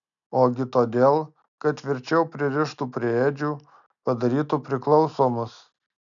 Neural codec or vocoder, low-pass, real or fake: none; 7.2 kHz; real